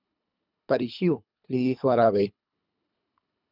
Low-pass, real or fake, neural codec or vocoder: 5.4 kHz; fake; codec, 24 kHz, 3 kbps, HILCodec